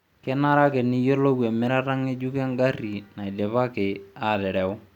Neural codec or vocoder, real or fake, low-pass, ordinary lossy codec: none; real; 19.8 kHz; none